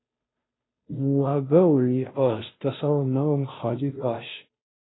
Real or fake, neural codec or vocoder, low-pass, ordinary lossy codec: fake; codec, 16 kHz, 0.5 kbps, FunCodec, trained on Chinese and English, 25 frames a second; 7.2 kHz; AAC, 16 kbps